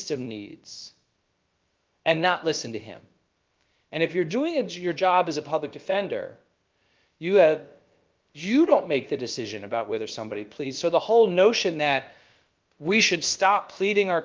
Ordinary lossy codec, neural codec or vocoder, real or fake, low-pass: Opus, 32 kbps; codec, 16 kHz, about 1 kbps, DyCAST, with the encoder's durations; fake; 7.2 kHz